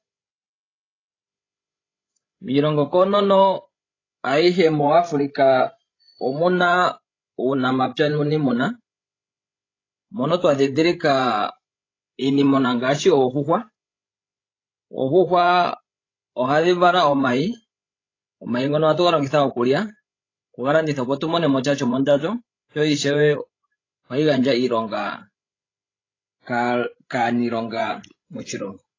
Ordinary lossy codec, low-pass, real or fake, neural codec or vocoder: AAC, 32 kbps; 7.2 kHz; fake; codec, 16 kHz, 16 kbps, FreqCodec, larger model